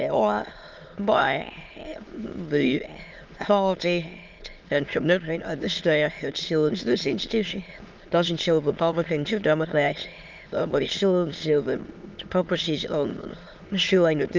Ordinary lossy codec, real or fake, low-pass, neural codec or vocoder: Opus, 24 kbps; fake; 7.2 kHz; autoencoder, 22.05 kHz, a latent of 192 numbers a frame, VITS, trained on many speakers